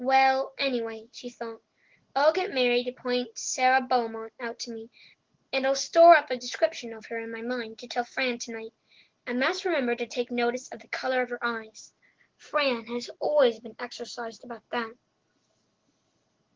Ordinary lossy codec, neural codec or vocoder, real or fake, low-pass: Opus, 16 kbps; none; real; 7.2 kHz